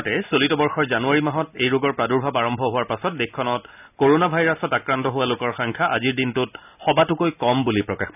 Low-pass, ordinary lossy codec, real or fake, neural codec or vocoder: 3.6 kHz; none; real; none